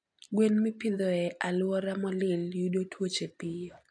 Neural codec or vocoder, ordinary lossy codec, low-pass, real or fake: none; AAC, 64 kbps; 9.9 kHz; real